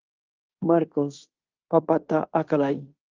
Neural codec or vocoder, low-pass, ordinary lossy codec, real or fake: codec, 16 kHz in and 24 kHz out, 0.9 kbps, LongCat-Audio-Codec, fine tuned four codebook decoder; 7.2 kHz; Opus, 24 kbps; fake